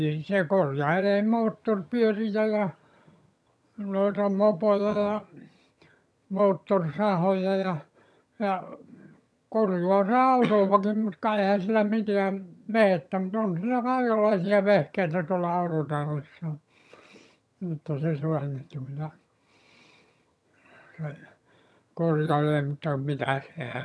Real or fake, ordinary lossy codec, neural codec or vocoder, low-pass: fake; none; vocoder, 22.05 kHz, 80 mel bands, HiFi-GAN; none